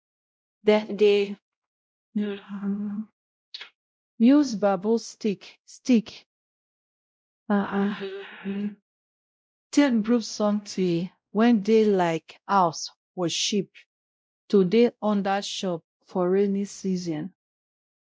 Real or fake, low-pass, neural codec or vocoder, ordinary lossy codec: fake; none; codec, 16 kHz, 0.5 kbps, X-Codec, WavLM features, trained on Multilingual LibriSpeech; none